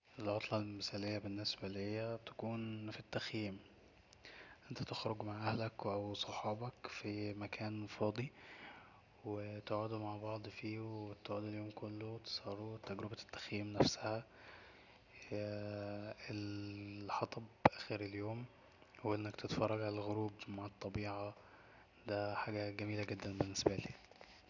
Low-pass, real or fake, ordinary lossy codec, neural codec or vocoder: 7.2 kHz; real; none; none